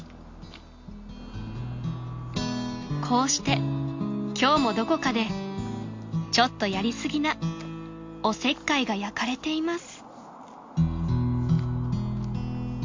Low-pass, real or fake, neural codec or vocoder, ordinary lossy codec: 7.2 kHz; real; none; MP3, 48 kbps